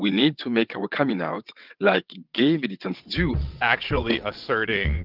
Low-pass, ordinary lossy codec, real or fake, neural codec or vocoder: 5.4 kHz; Opus, 32 kbps; fake; vocoder, 22.05 kHz, 80 mel bands, WaveNeXt